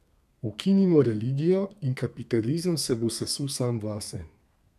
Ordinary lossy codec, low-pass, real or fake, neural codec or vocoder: none; 14.4 kHz; fake; codec, 32 kHz, 1.9 kbps, SNAC